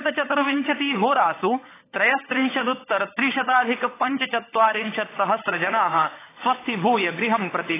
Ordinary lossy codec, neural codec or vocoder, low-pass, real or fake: AAC, 16 kbps; codec, 16 kHz, 16 kbps, FunCodec, trained on LibriTTS, 50 frames a second; 3.6 kHz; fake